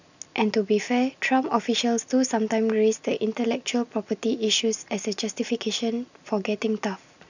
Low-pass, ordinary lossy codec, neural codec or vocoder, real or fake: 7.2 kHz; none; none; real